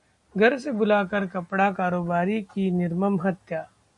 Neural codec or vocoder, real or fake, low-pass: none; real; 10.8 kHz